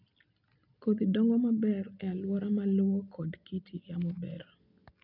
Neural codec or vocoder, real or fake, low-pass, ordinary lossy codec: none; real; 5.4 kHz; none